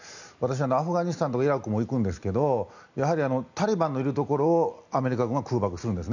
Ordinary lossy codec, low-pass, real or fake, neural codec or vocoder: none; 7.2 kHz; real; none